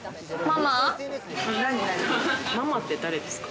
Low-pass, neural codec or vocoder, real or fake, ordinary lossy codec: none; none; real; none